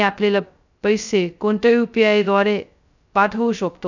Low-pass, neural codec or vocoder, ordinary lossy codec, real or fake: 7.2 kHz; codec, 16 kHz, 0.2 kbps, FocalCodec; none; fake